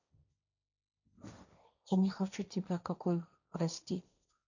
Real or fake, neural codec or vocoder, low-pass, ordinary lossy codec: fake; codec, 16 kHz, 1.1 kbps, Voila-Tokenizer; 7.2 kHz; none